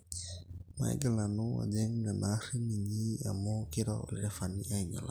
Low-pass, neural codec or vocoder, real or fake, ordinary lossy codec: none; none; real; none